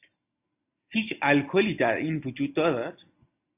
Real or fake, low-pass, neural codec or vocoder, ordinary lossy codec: real; 3.6 kHz; none; AAC, 32 kbps